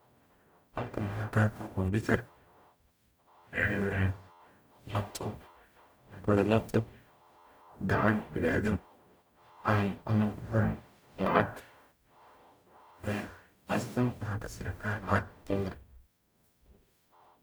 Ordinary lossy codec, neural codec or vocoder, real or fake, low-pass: none; codec, 44.1 kHz, 0.9 kbps, DAC; fake; none